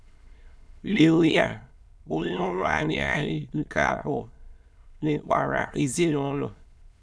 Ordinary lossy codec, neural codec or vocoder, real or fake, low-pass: none; autoencoder, 22.05 kHz, a latent of 192 numbers a frame, VITS, trained on many speakers; fake; none